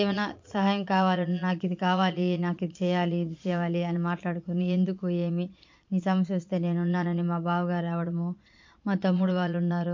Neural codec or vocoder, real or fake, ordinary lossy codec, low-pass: vocoder, 22.05 kHz, 80 mel bands, Vocos; fake; MP3, 48 kbps; 7.2 kHz